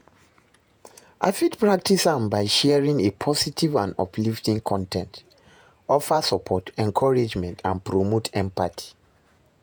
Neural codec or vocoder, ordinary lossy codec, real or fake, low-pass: vocoder, 48 kHz, 128 mel bands, Vocos; none; fake; none